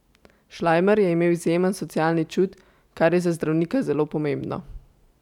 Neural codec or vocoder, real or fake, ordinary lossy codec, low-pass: vocoder, 44.1 kHz, 128 mel bands every 512 samples, BigVGAN v2; fake; none; 19.8 kHz